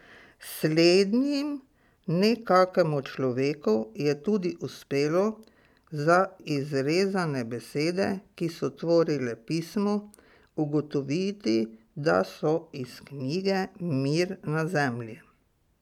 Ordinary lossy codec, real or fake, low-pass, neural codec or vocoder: none; real; 19.8 kHz; none